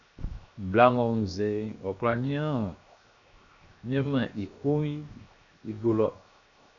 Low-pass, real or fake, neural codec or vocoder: 7.2 kHz; fake; codec, 16 kHz, 0.7 kbps, FocalCodec